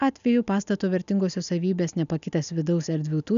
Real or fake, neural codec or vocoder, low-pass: real; none; 7.2 kHz